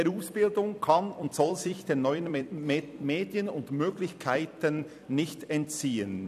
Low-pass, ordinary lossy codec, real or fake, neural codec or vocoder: 14.4 kHz; none; fake; vocoder, 44.1 kHz, 128 mel bands every 256 samples, BigVGAN v2